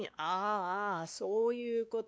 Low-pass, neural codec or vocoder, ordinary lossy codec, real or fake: none; codec, 16 kHz, 2 kbps, X-Codec, WavLM features, trained on Multilingual LibriSpeech; none; fake